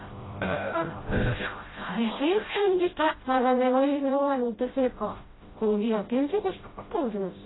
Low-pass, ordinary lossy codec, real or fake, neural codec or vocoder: 7.2 kHz; AAC, 16 kbps; fake; codec, 16 kHz, 0.5 kbps, FreqCodec, smaller model